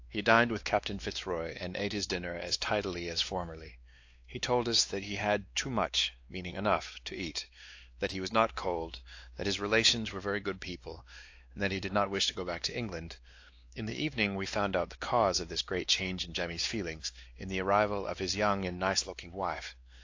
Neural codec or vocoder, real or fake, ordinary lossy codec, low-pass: codec, 16 kHz, 2 kbps, X-Codec, WavLM features, trained on Multilingual LibriSpeech; fake; AAC, 48 kbps; 7.2 kHz